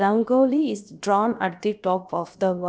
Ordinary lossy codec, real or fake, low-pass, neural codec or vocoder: none; fake; none; codec, 16 kHz, about 1 kbps, DyCAST, with the encoder's durations